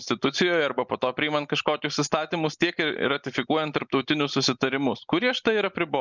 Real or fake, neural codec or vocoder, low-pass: real; none; 7.2 kHz